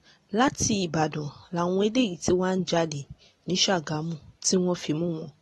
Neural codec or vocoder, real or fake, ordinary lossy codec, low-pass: none; real; AAC, 32 kbps; 19.8 kHz